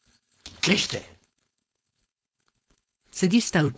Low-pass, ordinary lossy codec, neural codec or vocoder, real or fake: none; none; codec, 16 kHz, 4.8 kbps, FACodec; fake